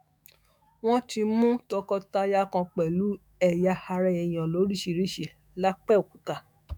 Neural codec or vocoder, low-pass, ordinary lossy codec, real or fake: autoencoder, 48 kHz, 128 numbers a frame, DAC-VAE, trained on Japanese speech; none; none; fake